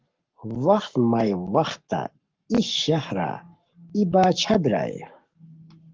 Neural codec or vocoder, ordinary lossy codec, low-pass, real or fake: codec, 44.1 kHz, 7.8 kbps, Pupu-Codec; Opus, 32 kbps; 7.2 kHz; fake